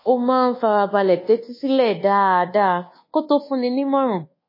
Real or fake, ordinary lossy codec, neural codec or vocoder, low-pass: fake; MP3, 24 kbps; codec, 24 kHz, 1.2 kbps, DualCodec; 5.4 kHz